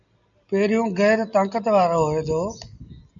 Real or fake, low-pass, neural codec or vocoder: real; 7.2 kHz; none